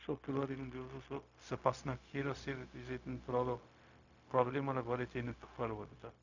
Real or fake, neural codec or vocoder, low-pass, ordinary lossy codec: fake; codec, 16 kHz, 0.4 kbps, LongCat-Audio-Codec; 7.2 kHz; none